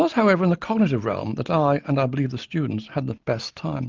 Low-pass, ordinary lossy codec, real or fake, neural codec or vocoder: 7.2 kHz; Opus, 24 kbps; real; none